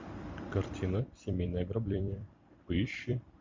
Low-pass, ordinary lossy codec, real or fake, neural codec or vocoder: 7.2 kHz; MP3, 48 kbps; fake; vocoder, 44.1 kHz, 128 mel bands every 256 samples, BigVGAN v2